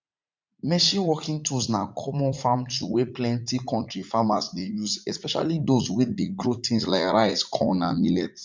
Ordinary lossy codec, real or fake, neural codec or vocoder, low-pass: MP3, 64 kbps; fake; vocoder, 44.1 kHz, 80 mel bands, Vocos; 7.2 kHz